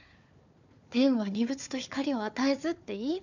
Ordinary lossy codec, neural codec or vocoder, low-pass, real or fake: none; codec, 16 kHz, 4 kbps, FunCodec, trained on Chinese and English, 50 frames a second; 7.2 kHz; fake